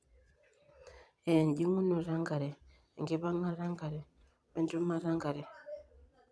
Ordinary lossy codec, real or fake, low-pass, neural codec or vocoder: none; fake; none; vocoder, 22.05 kHz, 80 mel bands, Vocos